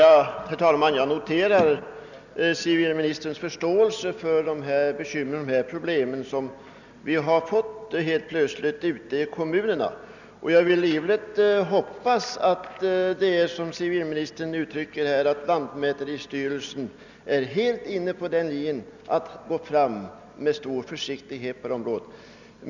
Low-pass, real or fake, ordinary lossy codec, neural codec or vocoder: 7.2 kHz; real; none; none